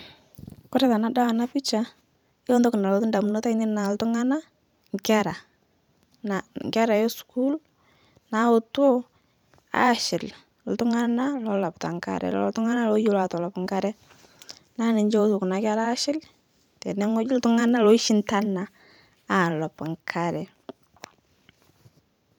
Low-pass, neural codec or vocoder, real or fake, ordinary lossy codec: 19.8 kHz; vocoder, 44.1 kHz, 128 mel bands every 512 samples, BigVGAN v2; fake; none